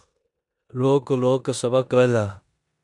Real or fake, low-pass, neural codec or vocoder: fake; 10.8 kHz; codec, 16 kHz in and 24 kHz out, 0.9 kbps, LongCat-Audio-Codec, four codebook decoder